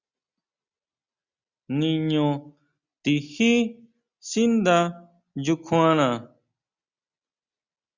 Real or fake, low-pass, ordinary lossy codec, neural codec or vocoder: real; 7.2 kHz; Opus, 64 kbps; none